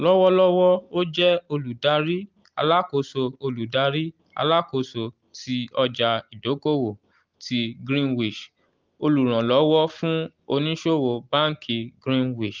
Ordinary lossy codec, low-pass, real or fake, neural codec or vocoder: Opus, 32 kbps; 7.2 kHz; real; none